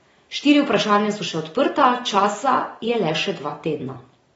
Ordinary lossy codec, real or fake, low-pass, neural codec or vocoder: AAC, 24 kbps; real; 19.8 kHz; none